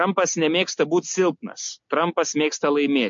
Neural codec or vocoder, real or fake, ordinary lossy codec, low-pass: none; real; MP3, 48 kbps; 7.2 kHz